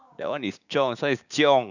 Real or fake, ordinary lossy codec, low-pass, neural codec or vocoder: fake; none; 7.2 kHz; codec, 16 kHz, 6 kbps, DAC